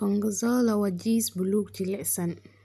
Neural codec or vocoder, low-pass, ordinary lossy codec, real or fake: none; 14.4 kHz; none; real